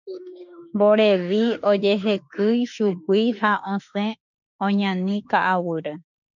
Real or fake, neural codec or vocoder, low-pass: fake; autoencoder, 48 kHz, 32 numbers a frame, DAC-VAE, trained on Japanese speech; 7.2 kHz